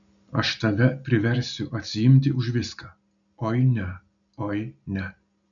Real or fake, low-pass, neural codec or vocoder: real; 7.2 kHz; none